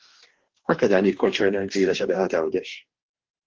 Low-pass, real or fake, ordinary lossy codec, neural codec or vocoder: 7.2 kHz; fake; Opus, 16 kbps; codec, 44.1 kHz, 2.6 kbps, DAC